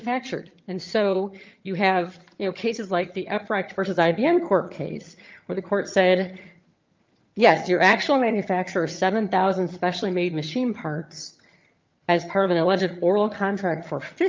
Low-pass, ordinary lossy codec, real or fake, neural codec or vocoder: 7.2 kHz; Opus, 24 kbps; fake; vocoder, 22.05 kHz, 80 mel bands, HiFi-GAN